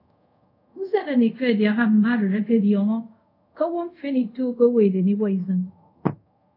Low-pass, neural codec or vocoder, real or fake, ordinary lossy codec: 5.4 kHz; codec, 24 kHz, 0.5 kbps, DualCodec; fake; AAC, 32 kbps